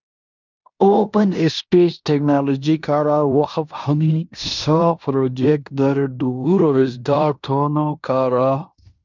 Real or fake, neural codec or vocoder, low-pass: fake; codec, 16 kHz in and 24 kHz out, 0.9 kbps, LongCat-Audio-Codec, fine tuned four codebook decoder; 7.2 kHz